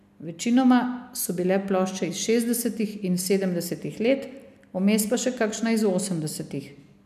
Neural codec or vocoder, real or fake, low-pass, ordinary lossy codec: none; real; 14.4 kHz; none